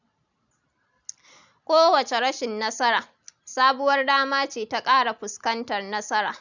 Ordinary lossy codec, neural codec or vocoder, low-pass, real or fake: none; none; 7.2 kHz; real